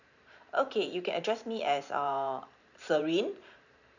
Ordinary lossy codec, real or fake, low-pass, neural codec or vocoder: none; fake; 7.2 kHz; vocoder, 44.1 kHz, 128 mel bands every 512 samples, BigVGAN v2